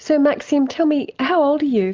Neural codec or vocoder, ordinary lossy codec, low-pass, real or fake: none; Opus, 24 kbps; 7.2 kHz; real